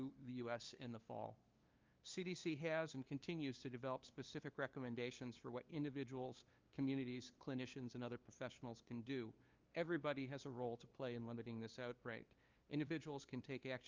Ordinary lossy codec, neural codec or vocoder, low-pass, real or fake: Opus, 24 kbps; codec, 16 kHz, 2 kbps, FunCodec, trained on LibriTTS, 25 frames a second; 7.2 kHz; fake